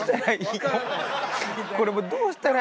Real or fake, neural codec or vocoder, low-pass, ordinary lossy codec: real; none; none; none